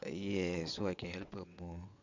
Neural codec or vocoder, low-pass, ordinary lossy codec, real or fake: vocoder, 22.05 kHz, 80 mel bands, WaveNeXt; 7.2 kHz; MP3, 64 kbps; fake